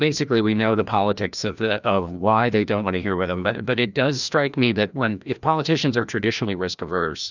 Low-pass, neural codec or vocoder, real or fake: 7.2 kHz; codec, 16 kHz, 1 kbps, FreqCodec, larger model; fake